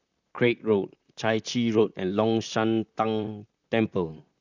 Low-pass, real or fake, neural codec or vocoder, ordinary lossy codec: 7.2 kHz; fake; vocoder, 44.1 kHz, 128 mel bands, Pupu-Vocoder; none